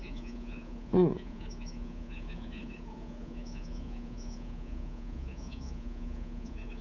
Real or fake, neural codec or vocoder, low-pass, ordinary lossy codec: fake; codec, 24 kHz, 3.1 kbps, DualCodec; 7.2 kHz; none